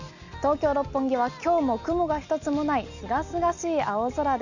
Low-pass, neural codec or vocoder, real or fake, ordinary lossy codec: 7.2 kHz; none; real; none